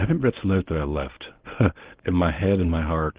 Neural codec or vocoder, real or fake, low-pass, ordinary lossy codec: codec, 24 kHz, 0.9 kbps, WavTokenizer, medium speech release version 1; fake; 3.6 kHz; Opus, 16 kbps